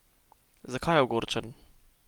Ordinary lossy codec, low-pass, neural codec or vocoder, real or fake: Opus, 24 kbps; 19.8 kHz; none; real